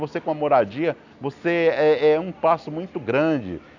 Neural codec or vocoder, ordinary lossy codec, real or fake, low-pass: none; none; real; 7.2 kHz